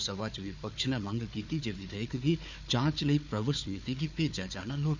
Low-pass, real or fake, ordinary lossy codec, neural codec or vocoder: 7.2 kHz; fake; none; codec, 16 kHz, 4 kbps, FunCodec, trained on Chinese and English, 50 frames a second